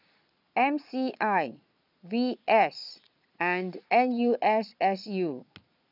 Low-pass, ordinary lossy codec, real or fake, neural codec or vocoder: 5.4 kHz; none; fake; vocoder, 22.05 kHz, 80 mel bands, Vocos